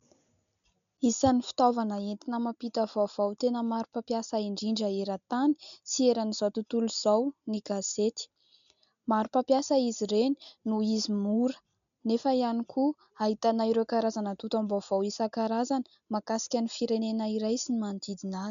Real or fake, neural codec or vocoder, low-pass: real; none; 7.2 kHz